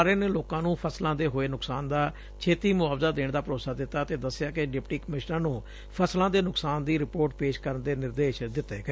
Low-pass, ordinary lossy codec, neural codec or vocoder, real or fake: none; none; none; real